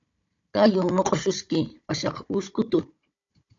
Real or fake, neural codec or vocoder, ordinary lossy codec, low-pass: fake; codec, 16 kHz, 16 kbps, FunCodec, trained on Chinese and English, 50 frames a second; AAC, 48 kbps; 7.2 kHz